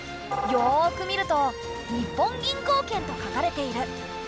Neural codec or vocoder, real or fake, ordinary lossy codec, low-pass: none; real; none; none